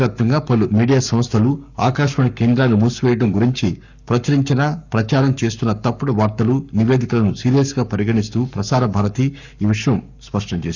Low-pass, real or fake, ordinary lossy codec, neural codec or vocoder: 7.2 kHz; fake; none; codec, 44.1 kHz, 7.8 kbps, Pupu-Codec